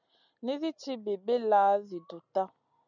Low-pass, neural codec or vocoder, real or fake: 7.2 kHz; none; real